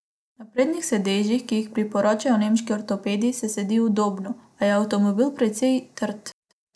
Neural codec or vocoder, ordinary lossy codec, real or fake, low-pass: none; none; real; none